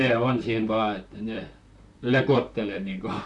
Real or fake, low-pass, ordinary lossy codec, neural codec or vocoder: fake; 10.8 kHz; none; vocoder, 44.1 kHz, 128 mel bands, Pupu-Vocoder